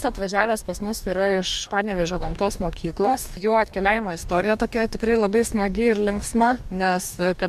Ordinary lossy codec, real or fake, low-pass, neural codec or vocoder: MP3, 96 kbps; fake; 14.4 kHz; codec, 44.1 kHz, 2.6 kbps, DAC